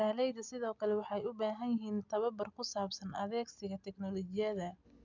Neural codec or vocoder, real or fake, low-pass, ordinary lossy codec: none; real; 7.2 kHz; none